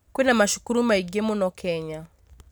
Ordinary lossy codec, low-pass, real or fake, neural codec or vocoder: none; none; real; none